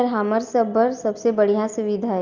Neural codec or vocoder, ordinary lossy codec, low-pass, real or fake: none; Opus, 32 kbps; 7.2 kHz; real